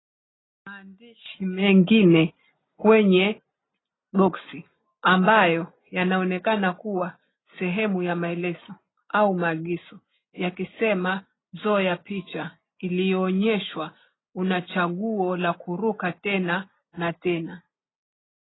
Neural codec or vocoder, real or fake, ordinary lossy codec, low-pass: none; real; AAC, 16 kbps; 7.2 kHz